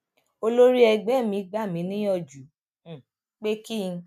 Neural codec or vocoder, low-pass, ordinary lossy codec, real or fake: none; 14.4 kHz; none; real